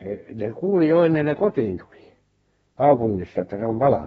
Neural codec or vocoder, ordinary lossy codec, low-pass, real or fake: codec, 32 kHz, 1.9 kbps, SNAC; AAC, 24 kbps; 14.4 kHz; fake